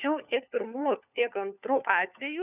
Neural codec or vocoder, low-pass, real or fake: codec, 16 kHz, 4 kbps, FunCodec, trained on LibriTTS, 50 frames a second; 3.6 kHz; fake